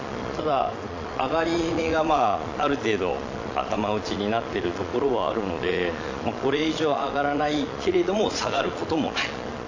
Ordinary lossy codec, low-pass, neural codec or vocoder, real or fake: AAC, 48 kbps; 7.2 kHz; vocoder, 22.05 kHz, 80 mel bands, Vocos; fake